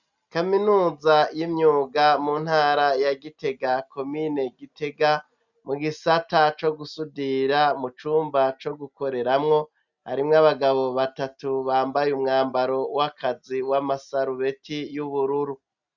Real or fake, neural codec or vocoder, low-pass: real; none; 7.2 kHz